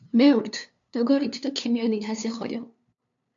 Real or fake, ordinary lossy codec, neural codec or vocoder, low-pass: fake; AAC, 64 kbps; codec, 16 kHz, 2 kbps, FunCodec, trained on LibriTTS, 25 frames a second; 7.2 kHz